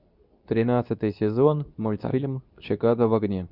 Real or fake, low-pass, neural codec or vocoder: fake; 5.4 kHz; codec, 24 kHz, 0.9 kbps, WavTokenizer, medium speech release version 2